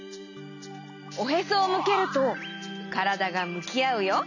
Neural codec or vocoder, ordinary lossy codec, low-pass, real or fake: none; none; 7.2 kHz; real